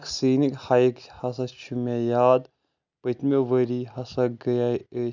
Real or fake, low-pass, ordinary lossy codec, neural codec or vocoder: real; 7.2 kHz; none; none